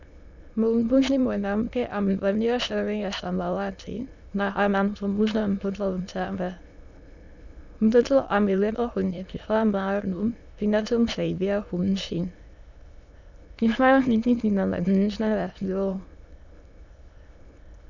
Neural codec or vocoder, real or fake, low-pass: autoencoder, 22.05 kHz, a latent of 192 numbers a frame, VITS, trained on many speakers; fake; 7.2 kHz